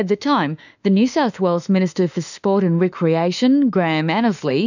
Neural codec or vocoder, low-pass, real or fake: autoencoder, 48 kHz, 32 numbers a frame, DAC-VAE, trained on Japanese speech; 7.2 kHz; fake